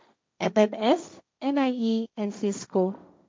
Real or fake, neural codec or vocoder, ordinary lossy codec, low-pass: fake; codec, 16 kHz, 1.1 kbps, Voila-Tokenizer; none; none